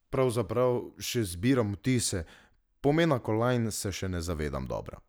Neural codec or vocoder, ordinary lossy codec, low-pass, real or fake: none; none; none; real